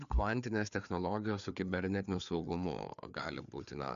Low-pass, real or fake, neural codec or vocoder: 7.2 kHz; fake; codec, 16 kHz, 4 kbps, FreqCodec, larger model